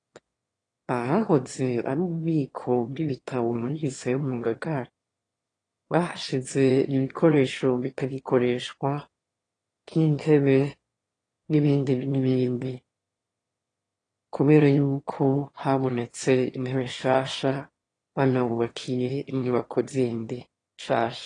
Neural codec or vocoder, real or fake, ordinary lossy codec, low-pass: autoencoder, 22.05 kHz, a latent of 192 numbers a frame, VITS, trained on one speaker; fake; AAC, 32 kbps; 9.9 kHz